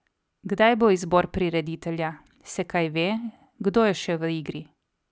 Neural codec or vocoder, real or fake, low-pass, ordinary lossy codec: none; real; none; none